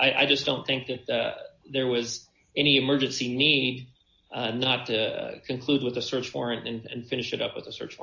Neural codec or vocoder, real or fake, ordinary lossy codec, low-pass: none; real; AAC, 48 kbps; 7.2 kHz